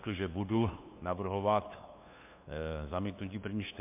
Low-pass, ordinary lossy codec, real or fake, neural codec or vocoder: 3.6 kHz; MP3, 32 kbps; fake; codec, 16 kHz, 2 kbps, FunCodec, trained on LibriTTS, 25 frames a second